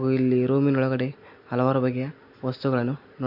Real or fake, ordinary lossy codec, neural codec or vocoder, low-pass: real; MP3, 32 kbps; none; 5.4 kHz